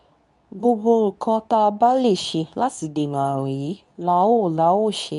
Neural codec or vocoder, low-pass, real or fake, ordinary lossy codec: codec, 24 kHz, 0.9 kbps, WavTokenizer, medium speech release version 2; 10.8 kHz; fake; none